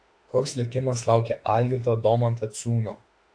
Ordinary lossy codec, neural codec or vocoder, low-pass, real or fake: AAC, 48 kbps; autoencoder, 48 kHz, 32 numbers a frame, DAC-VAE, trained on Japanese speech; 9.9 kHz; fake